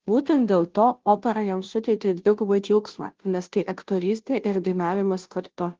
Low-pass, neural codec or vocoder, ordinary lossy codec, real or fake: 7.2 kHz; codec, 16 kHz, 0.5 kbps, FunCodec, trained on Chinese and English, 25 frames a second; Opus, 16 kbps; fake